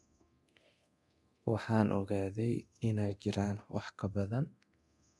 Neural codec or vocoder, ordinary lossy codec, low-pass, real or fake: codec, 24 kHz, 0.9 kbps, DualCodec; none; none; fake